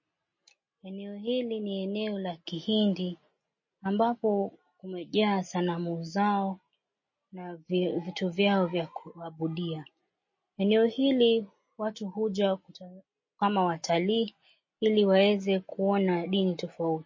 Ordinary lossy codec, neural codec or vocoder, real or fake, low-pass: MP3, 32 kbps; none; real; 7.2 kHz